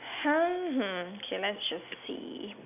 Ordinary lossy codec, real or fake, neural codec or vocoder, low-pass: none; real; none; 3.6 kHz